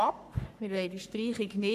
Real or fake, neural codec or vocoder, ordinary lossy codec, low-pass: fake; codec, 44.1 kHz, 3.4 kbps, Pupu-Codec; none; 14.4 kHz